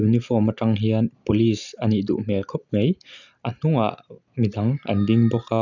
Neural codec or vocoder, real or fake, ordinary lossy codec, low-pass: none; real; none; 7.2 kHz